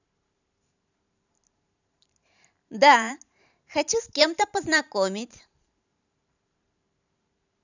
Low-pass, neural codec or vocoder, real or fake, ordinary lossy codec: 7.2 kHz; vocoder, 44.1 kHz, 128 mel bands every 512 samples, BigVGAN v2; fake; none